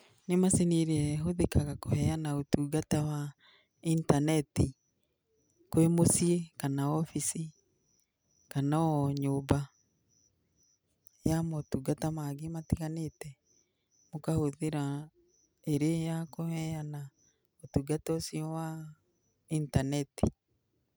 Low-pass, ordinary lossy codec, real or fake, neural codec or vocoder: none; none; real; none